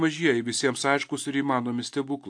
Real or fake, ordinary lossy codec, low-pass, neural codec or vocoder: real; AAC, 64 kbps; 9.9 kHz; none